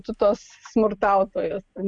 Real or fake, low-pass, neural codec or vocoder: real; 9.9 kHz; none